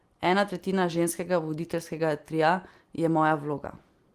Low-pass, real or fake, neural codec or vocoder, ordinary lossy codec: 14.4 kHz; real; none; Opus, 24 kbps